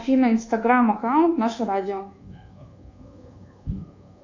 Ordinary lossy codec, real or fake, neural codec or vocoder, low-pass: AAC, 48 kbps; fake; codec, 24 kHz, 1.2 kbps, DualCodec; 7.2 kHz